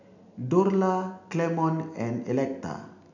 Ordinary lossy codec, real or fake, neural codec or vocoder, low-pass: none; real; none; 7.2 kHz